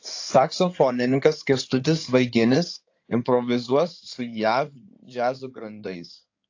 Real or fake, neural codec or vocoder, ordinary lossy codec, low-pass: fake; codec, 16 kHz in and 24 kHz out, 2.2 kbps, FireRedTTS-2 codec; AAC, 48 kbps; 7.2 kHz